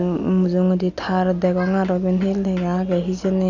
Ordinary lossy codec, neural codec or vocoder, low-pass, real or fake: none; none; 7.2 kHz; real